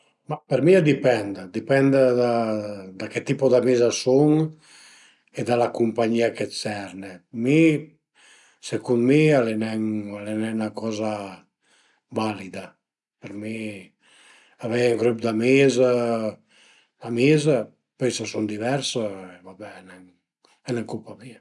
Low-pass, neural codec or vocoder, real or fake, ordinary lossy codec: 10.8 kHz; none; real; none